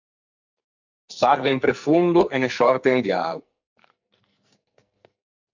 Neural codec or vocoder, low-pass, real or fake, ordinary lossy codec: codec, 44.1 kHz, 2.6 kbps, SNAC; 7.2 kHz; fake; MP3, 64 kbps